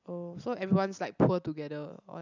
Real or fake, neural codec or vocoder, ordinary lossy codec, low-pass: real; none; none; 7.2 kHz